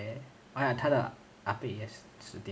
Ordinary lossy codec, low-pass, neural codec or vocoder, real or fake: none; none; none; real